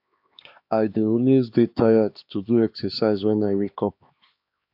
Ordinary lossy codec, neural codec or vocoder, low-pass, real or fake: AAC, 48 kbps; codec, 16 kHz, 2 kbps, X-Codec, HuBERT features, trained on LibriSpeech; 5.4 kHz; fake